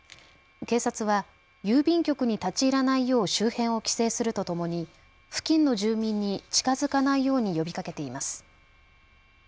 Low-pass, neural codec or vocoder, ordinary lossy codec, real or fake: none; none; none; real